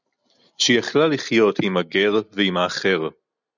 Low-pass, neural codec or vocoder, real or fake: 7.2 kHz; none; real